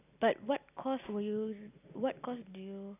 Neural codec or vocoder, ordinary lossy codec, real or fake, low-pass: none; none; real; 3.6 kHz